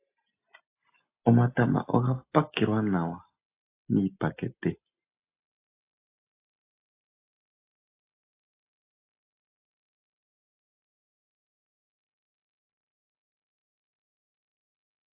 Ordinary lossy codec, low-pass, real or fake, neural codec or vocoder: AAC, 24 kbps; 3.6 kHz; real; none